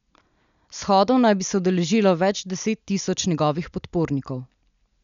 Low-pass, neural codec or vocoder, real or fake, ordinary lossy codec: 7.2 kHz; none; real; none